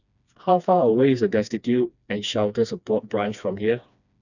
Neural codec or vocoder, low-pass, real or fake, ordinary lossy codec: codec, 16 kHz, 2 kbps, FreqCodec, smaller model; 7.2 kHz; fake; none